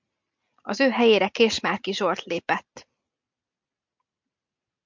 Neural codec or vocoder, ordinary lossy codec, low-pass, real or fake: none; MP3, 64 kbps; 7.2 kHz; real